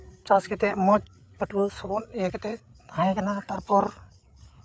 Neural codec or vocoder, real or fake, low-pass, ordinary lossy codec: codec, 16 kHz, 8 kbps, FreqCodec, larger model; fake; none; none